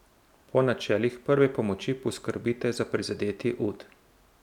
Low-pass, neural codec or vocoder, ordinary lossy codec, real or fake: 19.8 kHz; none; none; real